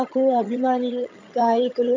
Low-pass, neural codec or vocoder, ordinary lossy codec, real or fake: 7.2 kHz; vocoder, 22.05 kHz, 80 mel bands, HiFi-GAN; MP3, 64 kbps; fake